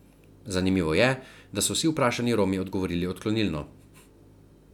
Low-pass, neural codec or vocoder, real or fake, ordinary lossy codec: 19.8 kHz; none; real; none